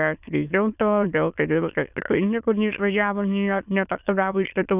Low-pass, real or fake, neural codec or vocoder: 3.6 kHz; fake; autoencoder, 22.05 kHz, a latent of 192 numbers a frame, VITS, trained on many speakers